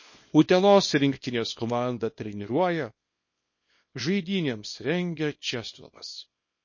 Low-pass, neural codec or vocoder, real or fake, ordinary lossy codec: 7.2 kHz; codec, 24 kHz, 0.9 kbps, WavTokenizer, small release; fake; MP3, 32 kbps